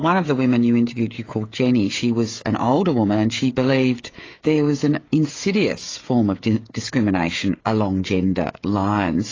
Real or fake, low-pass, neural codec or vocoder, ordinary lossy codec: fake; 7.2 kHz; codec, 16 kHz, 16 kbps, FreqCodec, smaller model; AAC, 32 kbps